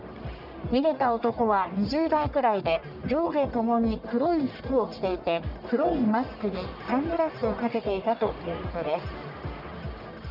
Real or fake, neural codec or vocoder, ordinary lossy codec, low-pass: fake; codec, 44.1 kHz, 1.7 kbps, Pupu-Codec; none; 5.4 kHz